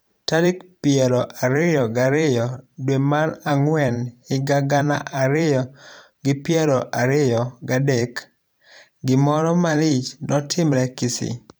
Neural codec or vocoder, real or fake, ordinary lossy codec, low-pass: vocoder, 44.1 kHz, 128 mel bands every 512 samples, BigVGAN v2; fake; none; none